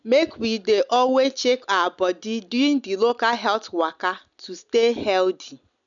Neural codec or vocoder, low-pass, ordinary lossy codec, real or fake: none; 7.2 kHz; none; real